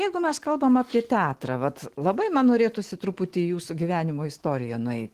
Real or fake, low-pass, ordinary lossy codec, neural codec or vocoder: fake; 14.4 kHz; Opus, 16 kbps; autoencoder, 48 kHz, 128 numbers a frame, DAC-VAE, trained on Japanese speech